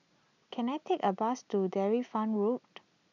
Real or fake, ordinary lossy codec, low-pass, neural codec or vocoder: real; none; 7.2 kHz; none